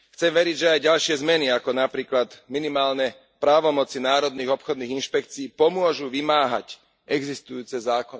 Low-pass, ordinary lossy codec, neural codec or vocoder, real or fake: none; none; none; real